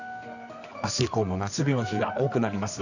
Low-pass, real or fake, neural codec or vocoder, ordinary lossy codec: 7.2 kHz; fake; codec, 24 kHz, 0.9 kbps, WavTokenizer, medium music audio release; none